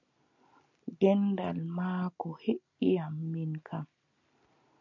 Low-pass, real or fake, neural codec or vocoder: 7.2 kHz; real; none